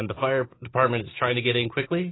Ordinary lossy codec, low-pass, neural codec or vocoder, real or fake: AAC, 16 kbps; 7.2 kHz; none; real